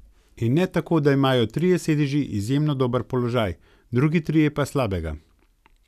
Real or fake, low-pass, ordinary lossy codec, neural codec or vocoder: real; 14.4 kHz; none; none